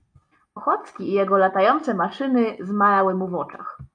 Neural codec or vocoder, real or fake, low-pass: none; real; 9.9 kHz